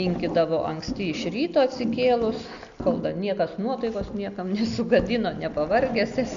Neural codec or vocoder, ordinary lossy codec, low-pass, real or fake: none; MP3, 64 kbps; 7.2 kHz; real